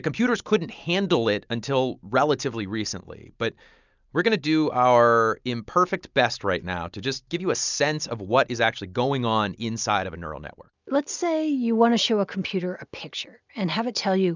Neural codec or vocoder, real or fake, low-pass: none; real; 7.2 kHz